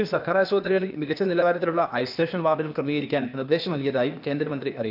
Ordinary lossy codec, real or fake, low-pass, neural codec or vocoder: none; fake; 5.4 kHz; codec, 16 kHz, 0.8 kbps, ZipCodec